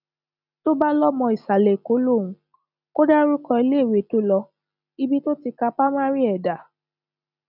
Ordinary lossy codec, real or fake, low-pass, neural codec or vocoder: none; real; 5.4 kHz; none